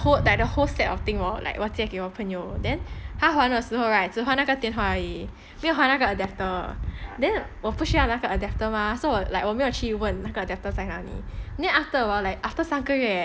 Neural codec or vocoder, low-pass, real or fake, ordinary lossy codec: none; none; real; none